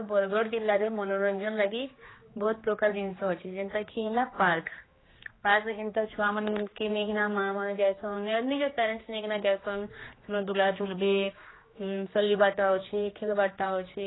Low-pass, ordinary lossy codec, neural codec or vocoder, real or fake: 7.2 kHz; AAC, 16 kbps; codec, 16 kHz, 2 kbps, X-Codec, HuBERT features, trained on general audio; fake